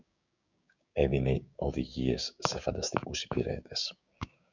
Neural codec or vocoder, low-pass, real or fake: codec, 16 kHz, 6 kbps, DAC; 7.2 kHz; fake